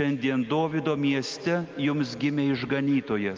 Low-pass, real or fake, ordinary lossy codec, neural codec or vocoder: 7.2 kHz; real; Opus, 32 kbps; none